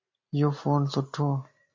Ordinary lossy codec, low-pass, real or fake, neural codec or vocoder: MP3, 32 kbps; 7.2 kHz; real; none